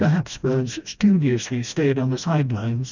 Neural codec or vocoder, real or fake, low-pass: codec, 16 kHz, 1 kbps, FreqCodec, smaller model; fake; 7.2 kHz